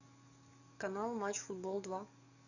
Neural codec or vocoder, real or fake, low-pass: codec, 44.1 kHz, 7.8 kbps, DAC; fake; 7.2 kHz